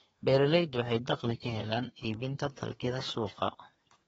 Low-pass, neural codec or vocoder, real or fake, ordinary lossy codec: 14.4 kHz; codec, 32 kHz, 1.9 kbps, SNAC; fake; AAC, 24 kbps